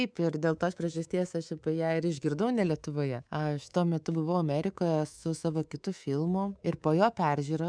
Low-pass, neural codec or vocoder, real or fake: 9.9 kHz; codec, 24 kHz, 3.1 kbps, DualCodec; fake